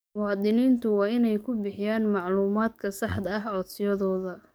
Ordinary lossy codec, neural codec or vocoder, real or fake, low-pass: none; codec, 44.1 kHz, 7.8 kbps, DAC; fake; none